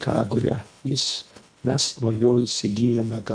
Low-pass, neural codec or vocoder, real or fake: 9.9 kHz; codec, 24 kHz, 1.5 kbps, HILCodec; fake